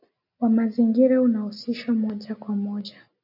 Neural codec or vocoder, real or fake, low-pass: none; real; 5.4 kHz